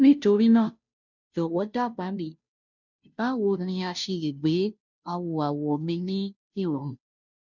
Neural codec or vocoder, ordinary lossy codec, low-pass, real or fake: codec, 16 kHz, 0.5 kbps, FunCodec, trained on Chinese and English, 25 frames a second; none; 7.2 kHz; fake